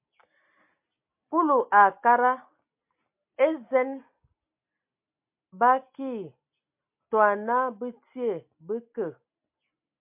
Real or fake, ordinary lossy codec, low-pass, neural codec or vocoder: real; MP3, 32 kbps; 3.6 kHz; none